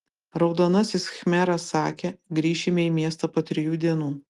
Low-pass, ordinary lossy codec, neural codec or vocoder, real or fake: 10.8 kHz; Opus, 24 kbps; none; real